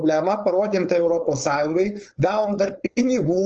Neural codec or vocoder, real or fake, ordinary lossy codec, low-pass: codec, 16 kHz, 4.8 kbps, FACodec; fake; Opus, 24 kbps; 7.2 kHz